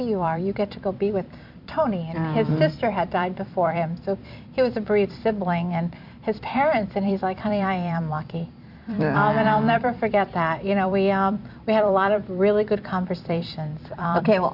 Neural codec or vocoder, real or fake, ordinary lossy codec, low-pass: vocoder, 44.1 kHz, 128 mel bands every 256 samples, BigVGAN v2; fake; MP3, 48 kbps; 5.4 kHz